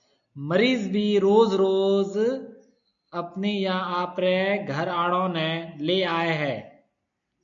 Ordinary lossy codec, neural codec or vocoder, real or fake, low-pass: AAC, 64 kbps; none; real; 7.2 kHz